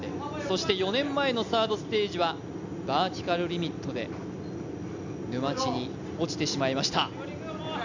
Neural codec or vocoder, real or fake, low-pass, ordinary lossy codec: none; real; 7.2 kHz; none